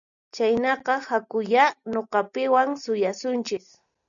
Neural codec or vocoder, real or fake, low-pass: none; real; 7.2 kHz